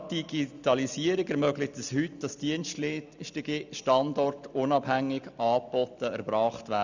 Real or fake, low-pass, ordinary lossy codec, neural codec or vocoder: real; 7.2 kHz; none; none